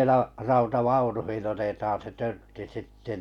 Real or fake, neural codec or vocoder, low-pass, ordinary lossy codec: real; none; 19.8 kHz; none